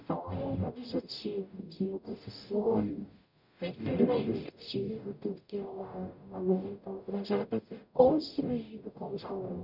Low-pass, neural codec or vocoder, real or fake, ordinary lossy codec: 5.4 kHz; codec, 44.1 kHz, 0.9 kbps, DAC; fake; none